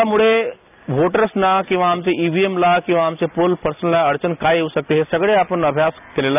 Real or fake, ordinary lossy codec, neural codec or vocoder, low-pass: real; none; none; 3.6 kHz